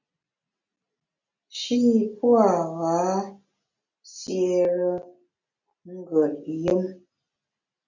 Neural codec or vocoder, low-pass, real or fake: none; 7.2 kHz; real